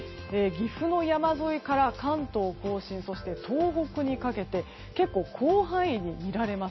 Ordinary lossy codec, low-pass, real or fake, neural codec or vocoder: MP3, 24 kbps; 7.2 kHz; real; none